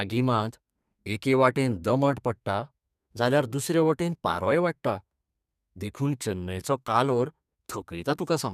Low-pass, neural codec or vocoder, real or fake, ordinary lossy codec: 14.4 kHz; codec, 32 kHz, 1.9 kbps, SNAC; fake; none